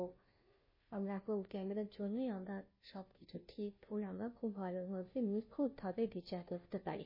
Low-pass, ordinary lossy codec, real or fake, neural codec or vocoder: 5.4 kHz; MP3, 24 kbps; fake; codec, 16 kHz, 0.5 kbps, FunCodec, trained on Chinese and English, 25 frames a second